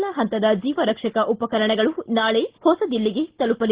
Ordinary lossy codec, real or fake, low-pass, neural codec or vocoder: Opus, 16 kbps; real; 3.6 kHz; none